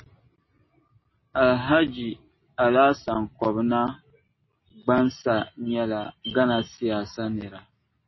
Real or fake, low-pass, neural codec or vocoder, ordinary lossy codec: real; 7.2 kHz; none; MP3, 24 kbps